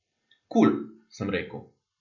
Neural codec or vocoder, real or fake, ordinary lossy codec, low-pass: none; real; none; 7.2 kHz